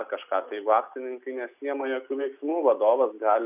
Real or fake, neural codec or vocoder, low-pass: real; none; 3.6 kHz